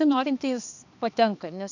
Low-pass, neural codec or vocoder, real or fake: 7.2 kHz; codec, 16 kHz, 2 kbps, X-Codec, HuBERT features, trained on balanced general audio; fake